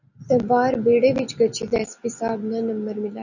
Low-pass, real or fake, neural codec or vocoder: 7.2 kHz; real; none